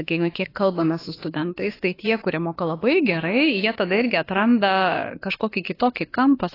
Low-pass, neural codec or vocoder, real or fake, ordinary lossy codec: 5.4 kHz; autoencoder, 48 kHz, 32 numbers a frame, DAC-VAE, trained on Japanese speech; fake; AAC, 24 kbps